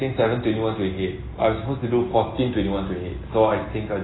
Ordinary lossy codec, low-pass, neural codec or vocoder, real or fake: AAC, 16 kbps; 7.2 kHz; none; real